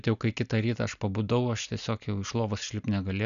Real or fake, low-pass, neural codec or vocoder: real; 7.2 kHz; none